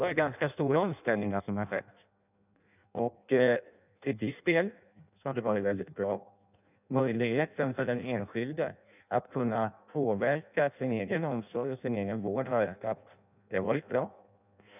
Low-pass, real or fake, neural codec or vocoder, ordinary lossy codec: 3.6 kHz; fake; codec, 16 kHz in and 24 kHz out, 0.6 kbps, FireRedTTS-2 codec; none